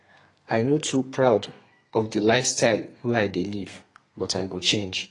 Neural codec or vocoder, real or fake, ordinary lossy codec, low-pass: codec, 32 kHz, 1.9 kbps, SNAC; fake; AAC, 32 kbps; 10.8 kHz